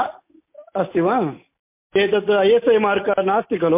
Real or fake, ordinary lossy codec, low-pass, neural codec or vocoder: real; MP3, 24 kbps; 3.6 kHz; none